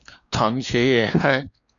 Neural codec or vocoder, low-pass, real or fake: codec, 16 kHz, 2 kbps, X-Codec, WavLM features, trained on Multilingual LibriSpeech; 7.2 kHz; fake